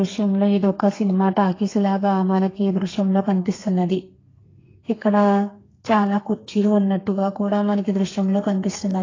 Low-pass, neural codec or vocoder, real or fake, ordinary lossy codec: 7.2 kHz; codec, 32 kHz, 1.9 kbps, SNAC; fake; AAC, 32 kbps